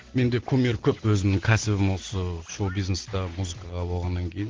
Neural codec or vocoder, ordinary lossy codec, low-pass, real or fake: none; Opus, 16 kbps; 7.2 kHz; real